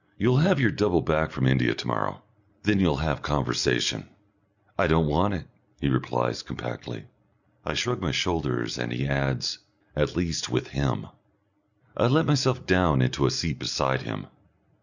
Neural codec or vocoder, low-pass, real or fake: none; 7.2 kHz; real